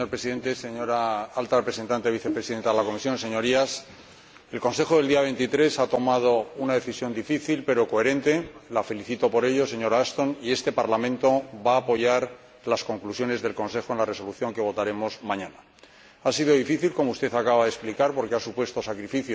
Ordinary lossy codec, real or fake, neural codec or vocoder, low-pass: none; real; none; none